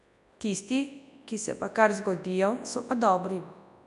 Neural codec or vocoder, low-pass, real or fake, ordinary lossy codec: codec, 24 kHz, 0.9 kbps, WavTokenizer, large speech release; 10.8 kHz; fake; none